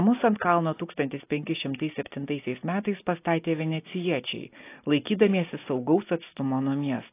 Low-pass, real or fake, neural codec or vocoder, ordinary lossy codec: 3.6 kHz; real; none; AAC, 24 kbps